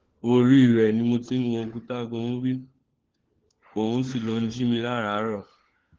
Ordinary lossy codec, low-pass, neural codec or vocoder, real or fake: Opus, 16 kbps; 7.2 kHz; codec, 16 kHz, 4 kbps, FunCodec, trained on LibriTTS, 50 frames a second; fake